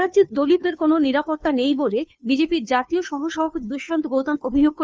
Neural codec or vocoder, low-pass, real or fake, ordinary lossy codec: codec, 16 kHz, 2 kbps, FunCodec, trained on Chinese and English, 25 frames a second; none; fake; none